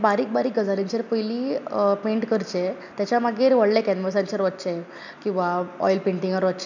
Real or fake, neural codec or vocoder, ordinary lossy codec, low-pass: real; none; none; 7.2 kHz